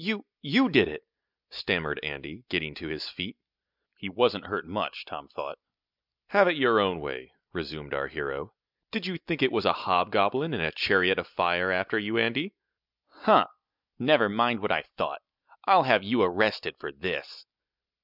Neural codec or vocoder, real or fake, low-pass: none; real; 5.4 kHz